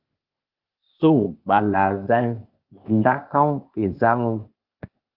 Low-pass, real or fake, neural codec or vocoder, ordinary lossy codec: 5.4 kHz; fake; codec, 16 kHz, 0.8 kbps, ZipCodec; Opus, 32 kbps